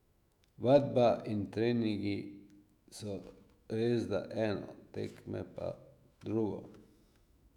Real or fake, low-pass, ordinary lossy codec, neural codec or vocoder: fake; 19.8 kHz; none; autoencoder, 48 kHz, 128 numbers a frame, DAC-VAE, trained on Japanese speech